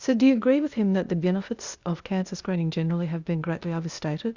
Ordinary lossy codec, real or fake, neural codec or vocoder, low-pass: Opus, 64 kbps; fake; codec, 16 kHz, 0.8 kbps, ZipCodec; 7.2 kHz